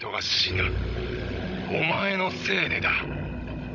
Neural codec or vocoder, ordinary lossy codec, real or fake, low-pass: codec, 16 kHz, 16 kbps, FunCodec, trained on Chinese and English, 50 frames a second; none; fake; 7.2 kHz